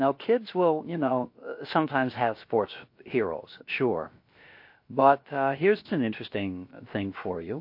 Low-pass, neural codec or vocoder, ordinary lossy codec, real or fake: 5.4 kHz; codec, 16 kHz, about 1 kbps, DyCAST, with the encoder's durations; MP3, 32 kbps; fake